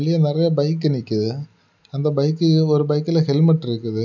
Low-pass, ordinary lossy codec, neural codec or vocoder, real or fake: 7.2 kHz; none; none; real